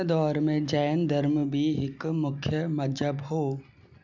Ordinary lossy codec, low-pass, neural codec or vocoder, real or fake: none; 7.2 kHz; none; real